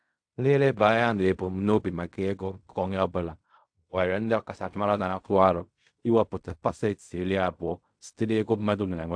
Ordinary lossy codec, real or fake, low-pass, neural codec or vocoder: AAC, 64 kbps; fake; 9.9 kHz; codec, 16 kHz in and 24 kHz out, 0.4 kbps, LongCat-Audio-Codec, fine tuned four codebook decoder